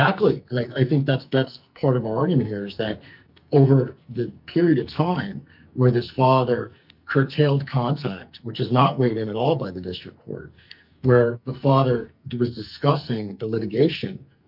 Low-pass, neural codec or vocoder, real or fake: 5.4 kHz; codec, 44.1 kHz, 2.6 kbps, SNAC; fake